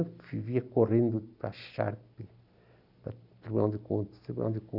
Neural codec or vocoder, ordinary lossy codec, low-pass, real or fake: none; none; 5.4 kHz; real